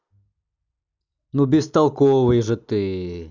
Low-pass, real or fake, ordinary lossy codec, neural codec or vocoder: 7.2 kHz; real; none; none